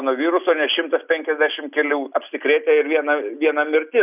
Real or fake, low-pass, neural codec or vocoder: real; 3.6 kHz; none